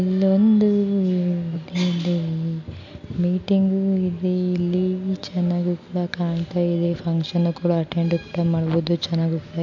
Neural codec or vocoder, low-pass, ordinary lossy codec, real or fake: none; 7.2 kHz; MP3, 48 kbps; real